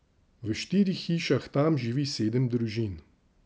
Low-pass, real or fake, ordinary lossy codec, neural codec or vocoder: none; real; none; none